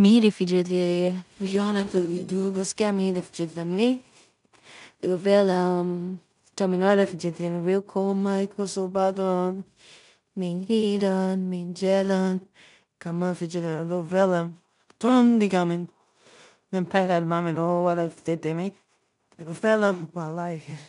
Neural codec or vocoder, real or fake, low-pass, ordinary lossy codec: codec, 16 kHz in and 24 kHz out, 0.4 kbps, LongCat-Audio-Codec, two codebook decoder; fake; 10.8 kHz; none